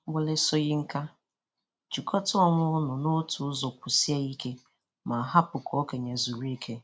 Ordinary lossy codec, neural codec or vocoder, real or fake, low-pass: none; none; real; none